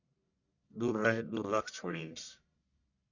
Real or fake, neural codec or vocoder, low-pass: fake; codec, 44.1 kHz, 1.7 kbps, Pupu-Codec; 7.2 kHz